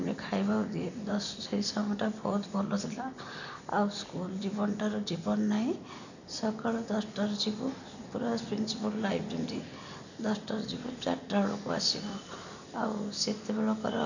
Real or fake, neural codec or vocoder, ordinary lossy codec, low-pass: real; none; none; 7.2 kHz